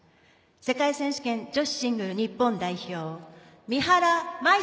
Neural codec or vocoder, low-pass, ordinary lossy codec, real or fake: none; none; none; real